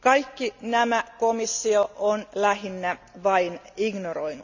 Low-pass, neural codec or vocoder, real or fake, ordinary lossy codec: 7.2 kHz; none; real; none